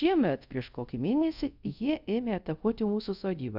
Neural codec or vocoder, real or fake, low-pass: codec, 24 kHz, 0.5 kbps, DualCodec; fake; 5.4 kHz